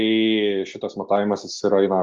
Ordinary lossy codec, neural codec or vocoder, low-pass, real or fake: Opus, 32 kbps; none; 7.2 kHz; real